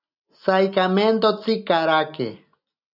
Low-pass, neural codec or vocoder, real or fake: 5.4 kHz; none; real